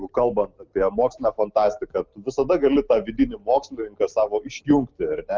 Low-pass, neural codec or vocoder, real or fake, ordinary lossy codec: 7.2 kHz; none; real; Opus, 32 kbps